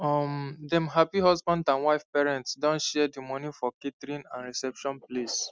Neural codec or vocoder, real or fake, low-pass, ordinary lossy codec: none; real; none; none